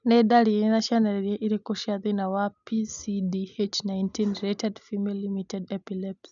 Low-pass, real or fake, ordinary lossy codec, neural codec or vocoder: 7.2 kHz; real; none; none